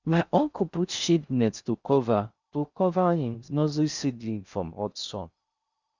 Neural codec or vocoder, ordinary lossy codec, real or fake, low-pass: codec, 16 kHz in and 24 kHz out, 0.6 kbps, FocalCodec, streaming, 4096 codes; Opus, 64 kbps; fake; 7.2 kHz